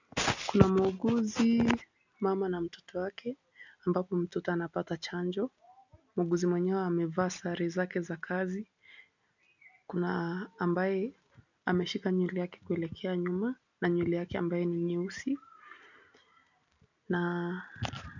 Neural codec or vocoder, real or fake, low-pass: none; real; 7.2 kHz